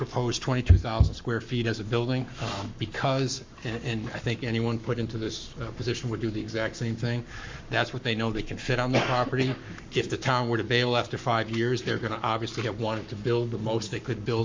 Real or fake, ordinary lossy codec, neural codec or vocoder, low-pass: fake; MP3, 64 kbps; codec, 44.1 kHz, 7.8 kbps, Pupu-Codec; 7.2 kHz